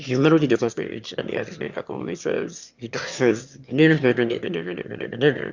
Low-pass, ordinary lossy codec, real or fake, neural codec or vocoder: 7.2 kHz; Opus, 64 kbps; fake; autoencoder, 22.05 kHz, a latent of 192 numbers a frame, VITS, trained on one speaker